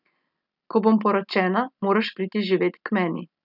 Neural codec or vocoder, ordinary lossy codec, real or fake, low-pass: none; none; real; 5.4 kHz